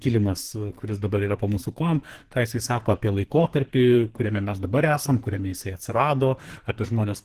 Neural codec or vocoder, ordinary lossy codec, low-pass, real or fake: codec, 44.1 kHz, 2.6 kbps, SNAC; Opus, 16 kbps; 14.4 kHz; fake